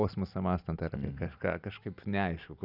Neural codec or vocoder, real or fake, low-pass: none; real; 5.4 kHz